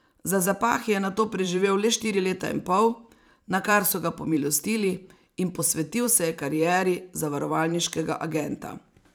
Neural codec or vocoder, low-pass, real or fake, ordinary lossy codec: vocoder, 44.1 kHz, 128 mel bands every 512 samples, BigVGAN v2; none; fake; none